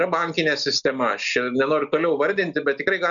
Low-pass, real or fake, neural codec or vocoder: 7.2 kHz; real; none